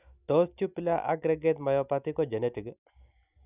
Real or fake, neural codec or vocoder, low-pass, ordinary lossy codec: real; none; 3.6 kHz; none